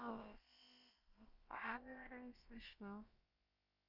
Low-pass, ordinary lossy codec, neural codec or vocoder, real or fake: 5.4 kHz; Opus, 32 kbps; codec, 16 kHz, about 1 kbps, DyCAST, with the encoder's durations; fake